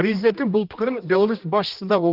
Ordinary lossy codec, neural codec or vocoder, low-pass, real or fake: Opus, 32 kbps; codec, 16 kHz, 1 kbps, X-Codec, HuBERT features, trained on general audio; 5.4 kHz; fake